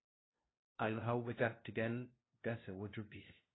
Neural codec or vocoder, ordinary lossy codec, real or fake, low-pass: codec, 16 kHz, 0.5 kbps, FunCodec, trained on LibriTTS, 25 frames a second; AAC, 16 kbps; fake; 7.2 kHz